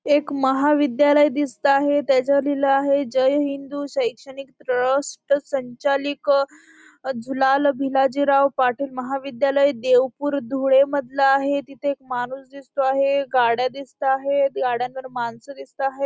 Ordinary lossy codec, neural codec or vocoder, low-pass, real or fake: none; none; none; real